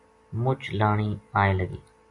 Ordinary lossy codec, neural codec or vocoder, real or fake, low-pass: AAC, 64 kbps; none; real; 10.8 kHz